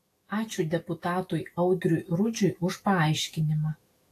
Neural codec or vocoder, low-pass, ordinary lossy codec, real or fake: autoencoder, 48 kHz, 128 numbers a frame, DAC-VAE, trained on Japanese speech; 14.4 kHz; AAC, 48 kbps; fake